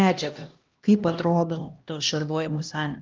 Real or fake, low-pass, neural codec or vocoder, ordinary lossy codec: fake; 7.2 kHz; codec, 16 kHz, 1 kbps, X-Codec, HuBERT features, trained on LibriSpeech; Opus, 32 kbps